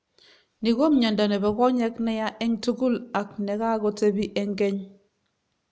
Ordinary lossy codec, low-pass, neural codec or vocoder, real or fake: none; none; none; real